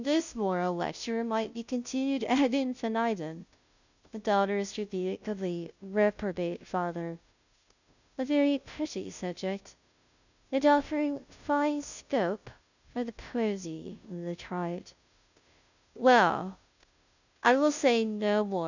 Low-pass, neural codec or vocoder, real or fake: 7.2 kHz; codec, 16 kHz, 0.5 kbps, FunCodec, trained on Chinese and English, 25 frames a second; fake